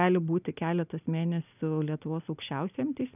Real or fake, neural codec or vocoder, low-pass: real; none; 3.6 kHz